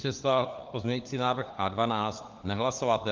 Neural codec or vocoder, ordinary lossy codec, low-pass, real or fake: codec, 16 kHz, 4 kbps, FunCodec, trained on LibriTTS, 50 frames a second; Opus, 24 kbps; 7.2 kHz; fake